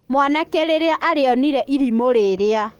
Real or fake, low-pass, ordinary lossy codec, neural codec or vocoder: fake; 19.8 kHz; Opus, 24 kbps; codec, 44.1 kHz, 7.8 kbps, DAC